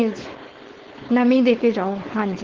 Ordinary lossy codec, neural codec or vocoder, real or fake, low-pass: Opus, 16 kbps; codec, 16 kHz, 4.8 kbps, FACodec; fake; 7.2 kHz